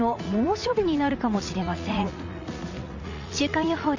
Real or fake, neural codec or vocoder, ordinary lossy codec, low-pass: fake; vocoder, 44.1 kHz, 80 mel bands, Vocos; Opus, 64 kbps; 7.2 kHz